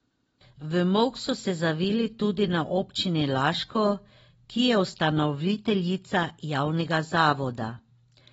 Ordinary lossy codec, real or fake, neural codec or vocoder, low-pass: AAC, 24 kbps; real; none; 19.8 kHz